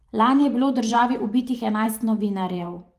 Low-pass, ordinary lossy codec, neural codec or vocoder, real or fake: 14.4 kHz; Opus, 24 kbps; vocoder, 44.1 kHz, 128 mel bands every 512 samples, BigVGAN v2; fake